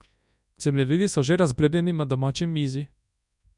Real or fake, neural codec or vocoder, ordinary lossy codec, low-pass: fake; codec, 24 kHz, 0.9 kbps, WavTokenizer, large speech release; none; 10.8 kHz